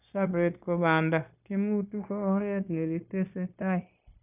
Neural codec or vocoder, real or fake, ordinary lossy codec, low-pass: codec, 16 kHz, 0.9 kbps, LongCat-Audio-Codec; fake; none; 3.6 kHz